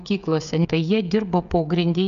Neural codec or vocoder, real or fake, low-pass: codec, 16 kHz, 8 kbps, FreqCodec, smaller model; fake; 7.2 kHz